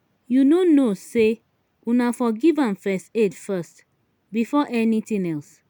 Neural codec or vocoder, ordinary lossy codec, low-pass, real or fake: none; none; 19.8 kHz; real